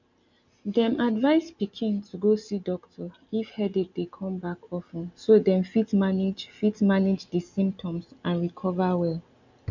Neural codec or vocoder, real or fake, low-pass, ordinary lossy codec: none; real; 7.2 kHz; none